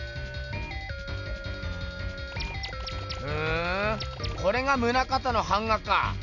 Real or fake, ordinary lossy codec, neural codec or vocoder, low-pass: real; none; none; 7.2 kHz